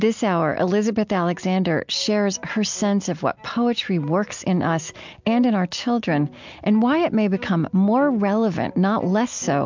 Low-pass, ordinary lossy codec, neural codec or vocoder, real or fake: 7.2 kHz; MP3, 64 kbps; none; real